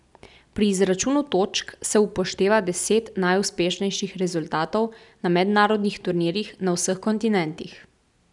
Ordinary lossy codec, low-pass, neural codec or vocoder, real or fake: none; 10.8 kHz; none; real